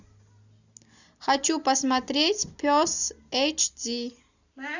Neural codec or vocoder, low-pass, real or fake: none; 7.2 kHz; real